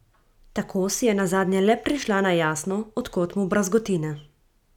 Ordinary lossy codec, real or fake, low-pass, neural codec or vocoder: none; real; 19.8 kHz; none